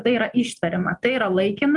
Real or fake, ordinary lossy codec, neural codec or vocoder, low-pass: real; Opus, 32 kbps; none; 10.8 kHz